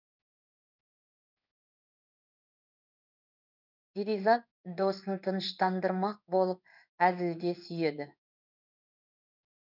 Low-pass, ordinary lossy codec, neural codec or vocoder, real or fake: 5.4 kHz; none; codec, 16 kHz in and 24 kHz out, 1 kbps, XY-Tokenizer; fake